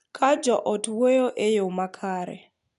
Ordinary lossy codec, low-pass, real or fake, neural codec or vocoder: none; 10.8 kHz; real; none